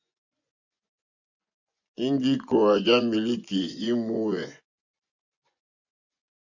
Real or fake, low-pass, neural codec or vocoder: fake; 7.2 kHz; vocoder, 24 kHz, 100 mel bands, Vocos